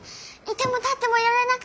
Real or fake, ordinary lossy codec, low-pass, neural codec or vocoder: real; none; none; none